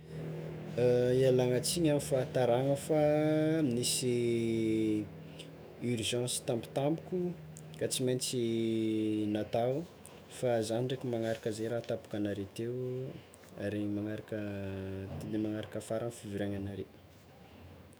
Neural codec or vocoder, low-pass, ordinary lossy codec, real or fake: autoencoder, 48 kHz, 128 numbers a frame, DAC-VAE, trained on Japanese speech; none; none; fake